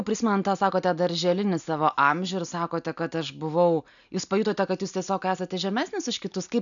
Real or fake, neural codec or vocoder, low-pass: real; none; 7.2 kHz